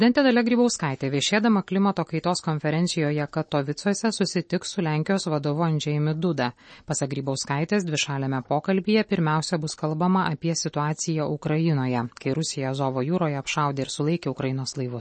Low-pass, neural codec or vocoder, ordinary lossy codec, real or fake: 10.8 kHz; none; MP3, 32 kbps; real